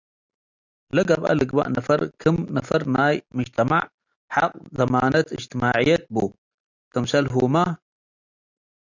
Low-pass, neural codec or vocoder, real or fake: 7.2 kHz; none; real